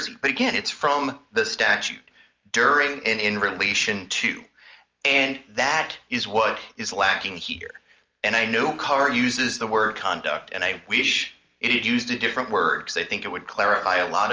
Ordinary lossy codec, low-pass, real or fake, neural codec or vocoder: Opus, 24 kbps; 7.2 kHz; real; none